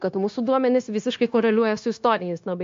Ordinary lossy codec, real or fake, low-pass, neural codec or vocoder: MP3, 48 kbps; fake; 7.2 kHz; codec, 16 kHz, 0.9 kbps, LongCat-Audio-Codec